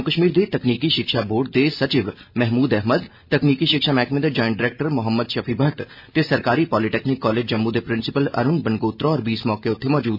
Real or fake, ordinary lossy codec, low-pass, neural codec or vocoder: real; MP3, 48 kbps; 5.4 kHz; none